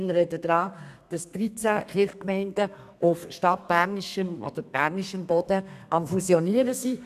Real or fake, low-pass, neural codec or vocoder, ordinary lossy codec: fake; 14.4 kHz; codec, 32 kHz, 1.9 kbps, SNAC; none